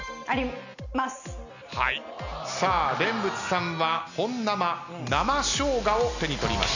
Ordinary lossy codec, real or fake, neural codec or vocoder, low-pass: none; real; none; 7.2 kHz